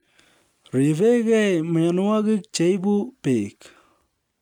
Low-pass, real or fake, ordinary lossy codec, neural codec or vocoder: 19.8 kHz; real; none; none